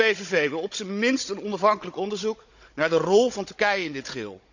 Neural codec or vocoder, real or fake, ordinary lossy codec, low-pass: codec, 16 kHz, 16 kbps, FunCodec, trained on Chinese and English, 50 frames a second; fake; none; 7.2 kHz